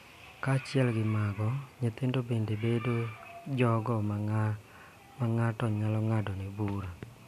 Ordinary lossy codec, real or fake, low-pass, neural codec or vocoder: none; real; 14.4 kHz; none